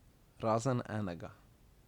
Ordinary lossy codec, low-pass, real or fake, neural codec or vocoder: none; 19.8 kHz; real; none